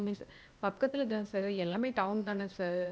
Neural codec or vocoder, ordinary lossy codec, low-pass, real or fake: codec, 16 kHz, 0.8 kbps, ZipCodec; none; none; fake